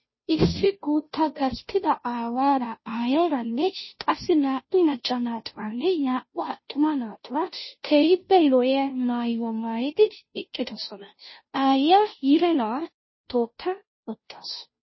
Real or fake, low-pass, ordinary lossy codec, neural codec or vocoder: fake; 7.2 kHz; MP3, 24 kbps; codec, 16 kHz, 0.5 kbps, FunCodec, trained on Chinese and English, 25 frames a second